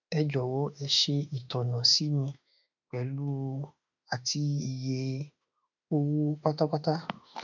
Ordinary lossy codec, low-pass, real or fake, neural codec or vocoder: none; 7.2 kHz; fake; autoencoder, 48 kHz, 32 numbers a frame, DAC-VAE, trained on Japanese speech